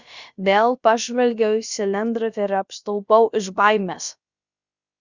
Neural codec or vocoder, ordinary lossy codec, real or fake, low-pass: codec, 16 kHz, about 1 kbps, DyCAST, with the encoder's durations; Opus, 64 kbps; fake; 7.2 kHz